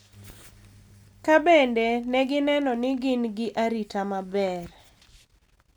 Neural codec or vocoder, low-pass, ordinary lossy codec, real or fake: none; none; none; real